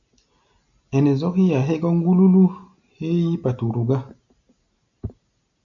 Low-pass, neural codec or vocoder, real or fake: 7.2 kHz; none; real